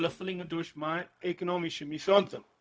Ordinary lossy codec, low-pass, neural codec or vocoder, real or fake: none; none; codec, 16 kHz, 0.4 kbps, LongCat-Audio-Codec; fake